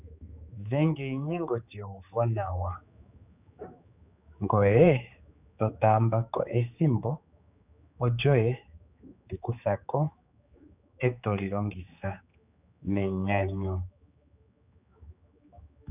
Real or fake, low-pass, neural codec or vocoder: fake; 3.6 kHz; codec, 16 kHz, 4 kbps, X-Codec, HuBERT features, trained on general audio